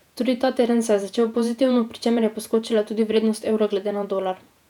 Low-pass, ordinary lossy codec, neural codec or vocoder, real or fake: 19.8 kHz; none; vocoder, 48 kHz, 128 mel bands, Vocos; fake